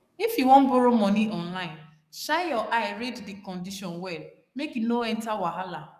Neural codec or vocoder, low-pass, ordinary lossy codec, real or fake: codec, 44.1 kHz, 7.8 kbps, DAC; 14.4 kHz; none; fake